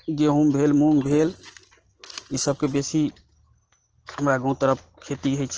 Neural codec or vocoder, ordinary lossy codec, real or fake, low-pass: none; Opus, 16 kbps; real; 7.2 kHz